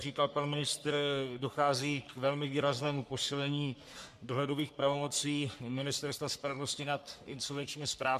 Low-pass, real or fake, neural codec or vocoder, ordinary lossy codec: 14.4 kHz; fake; codec, 44.1 kHz, 3.4 kbps, Pupu-Codec; MP3, 96 kbps